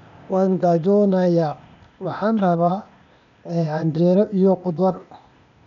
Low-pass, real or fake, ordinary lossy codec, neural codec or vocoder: 7.2 kHz; fake; none; codec, 16 kHz, 0.8 kbps, ZipCodec